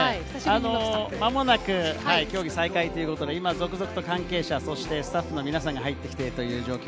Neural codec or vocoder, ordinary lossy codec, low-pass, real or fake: none; none; none; real